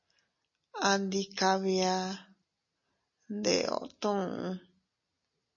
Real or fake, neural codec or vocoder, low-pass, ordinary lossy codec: real; none; 7.2 kHz; MP3, 32 kbps